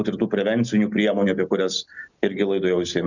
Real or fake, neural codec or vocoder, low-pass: real; none; 7.2 kHz